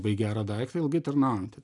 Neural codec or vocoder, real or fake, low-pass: none; real; 10.8 kHz